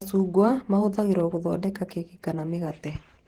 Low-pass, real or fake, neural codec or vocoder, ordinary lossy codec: 19.8 kHz; fake; vocoder, 48 kHz, 128 mel bands, Vocos; Opus, 16 kbps